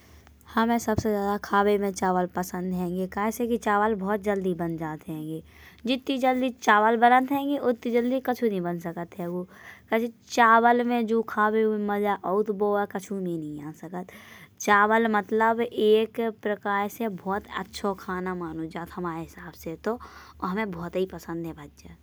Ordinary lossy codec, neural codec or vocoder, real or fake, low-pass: none; none; real; none